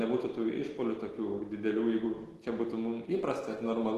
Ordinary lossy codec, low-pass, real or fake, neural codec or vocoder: Opus, 16 kbps; 14.4 kHz; real; none